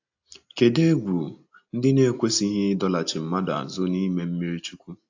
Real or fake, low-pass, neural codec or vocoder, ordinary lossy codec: real; 7.2 kHz; none; AAC, 48 kbps